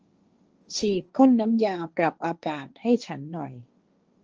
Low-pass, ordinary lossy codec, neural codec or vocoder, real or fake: 7.2 kHz; Opus, 24 kbps; codec, 16 kHz, 1.1 kbps, Voila-Tokenizer; fake